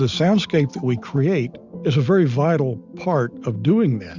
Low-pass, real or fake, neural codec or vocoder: 7.2 kHz; real; none